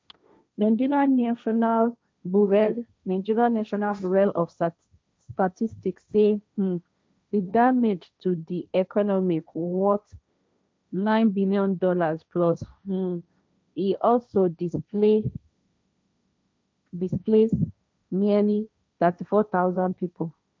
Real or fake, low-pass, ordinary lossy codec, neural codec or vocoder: fake; none; none; codec, 16 kHz, 1.1 kbps, Voila-Tokenizer